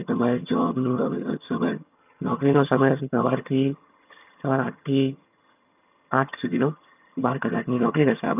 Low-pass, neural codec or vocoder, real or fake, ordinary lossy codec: 3.6 kHz; vocoder, 22.05 kHz, 80 mel bands, HiFi-GAN; fake; none